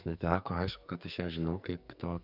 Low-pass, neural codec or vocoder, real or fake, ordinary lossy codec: 5.4 kHz; codec, 44.1 kHz, 2.6 kbps, SNAC; fake; Opus, 64 kbps